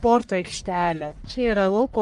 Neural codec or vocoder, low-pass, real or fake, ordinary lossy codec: codec, 44.1 kHz, 1.7 kbps, Pupu-Codec; 10.8 kHz; fake; Opus, 32 kbps